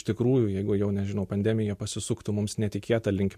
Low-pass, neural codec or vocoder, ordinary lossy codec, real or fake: 14.4 kHz; none; MP3, 64 kbps; real